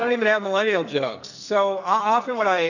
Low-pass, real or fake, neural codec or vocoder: 7.2 kHz; fake; codec, 44.1 kHz, 2.6 kbps, SNAC